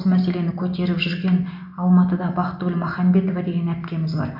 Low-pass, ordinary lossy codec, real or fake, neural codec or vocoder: 5.4 kHz; AAC, 48 kbps; real; none